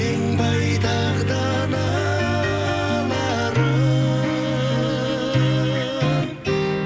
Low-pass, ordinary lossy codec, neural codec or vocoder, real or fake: none; none; none; real